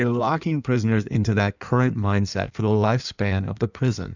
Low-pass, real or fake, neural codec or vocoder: 7.2 kHz; fake; codec, 16 kHz in and 24 kHz out, 1.1 kbps, FireRedTTS-2 codec